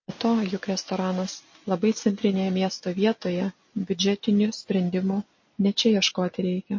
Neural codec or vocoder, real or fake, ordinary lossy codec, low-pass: none; real; MP3, 32 kbps; 7.2 kHz